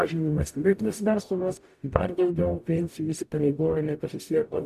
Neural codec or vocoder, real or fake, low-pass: codec, 44.1 kHz, 0.9 kbps, DAC; fake; 14.4 kHz